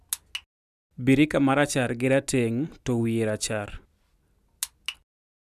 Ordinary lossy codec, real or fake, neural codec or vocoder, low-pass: none; real; none; 14.4 kHz